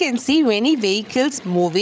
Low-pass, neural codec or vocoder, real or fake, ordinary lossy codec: none; codec, 16 kHz, 16 kbps, FreqCodec, larger model; fake; none